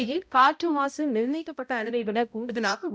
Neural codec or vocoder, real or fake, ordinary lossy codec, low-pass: codec, 16 kHz, 0.5 kbps, X-Codec, HuBERT features, trained on balanced general audio; fake; none; none